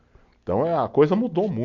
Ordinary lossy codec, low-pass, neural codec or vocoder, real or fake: none; 7.2 kHz; none; real